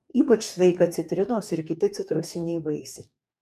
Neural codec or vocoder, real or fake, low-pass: codec, 44.1 kHz, 2.6 kbps, DAC; fake; 14.4 kHz